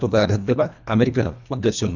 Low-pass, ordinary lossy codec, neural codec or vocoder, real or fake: 7.2 kHz; none; codec, 24 kHz, 1.5 kbps, HILCodec; fake